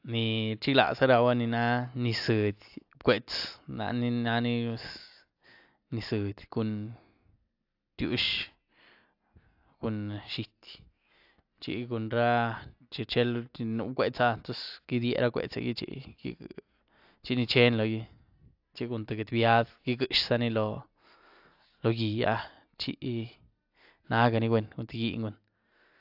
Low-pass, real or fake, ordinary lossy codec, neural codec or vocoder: 5.4 kHz; real; AAC, 48 kbps; none